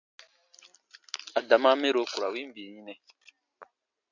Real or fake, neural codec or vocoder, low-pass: real; none; 7.2 kHz